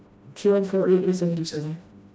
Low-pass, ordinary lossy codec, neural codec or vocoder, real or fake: none; none; codec, 16 kHz, 1 kbps, FreqCodec, smaller model; fake